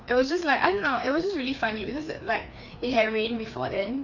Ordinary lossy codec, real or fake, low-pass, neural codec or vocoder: none; fake; 7.2 kHz; codec, 16 kHz, 2 kbps, FreqCodec, larger model